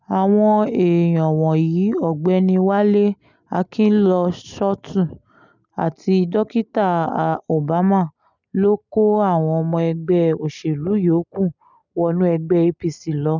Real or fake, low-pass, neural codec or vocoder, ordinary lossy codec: real; 7.2 kHz; none; none